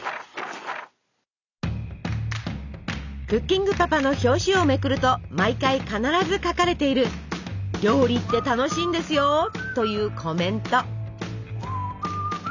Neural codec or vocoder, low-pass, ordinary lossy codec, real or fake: none; 7.2 kHz; none; real